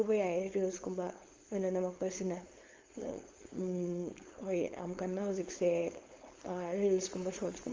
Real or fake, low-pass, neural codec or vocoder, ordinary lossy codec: fake; 7.2 kHz; codec, 16 kHz, 4.8 kbps, FACodec; Opus, 32 kbps